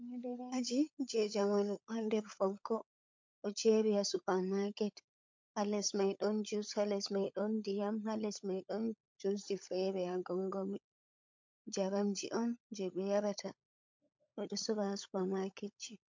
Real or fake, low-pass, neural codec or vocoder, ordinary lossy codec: fake; 7.2 kHz; codec, 16 kHz, 16 kbps, FunCodec, trained on LibriTTS, 50 frames a second; MP3, 48 kbps